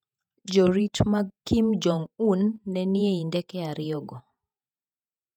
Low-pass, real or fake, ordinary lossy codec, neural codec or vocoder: 19.8 kHz; fake; none; vocoder, 48 kHz, 128 mel bands, Vocos